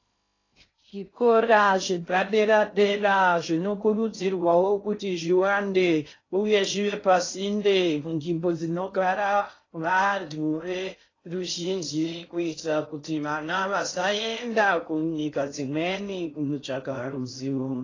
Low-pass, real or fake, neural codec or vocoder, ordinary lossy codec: 7.2 kHz; fake; codec, 16 kHz in and 24 kHz out, 0.6 kbps, FocalCodec, streaming, 2048 codes; AAC, 32 kbps